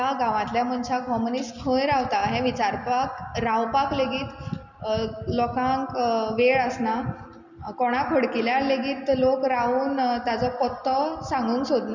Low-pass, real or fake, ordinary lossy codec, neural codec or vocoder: 7.2 kHz; real; none; none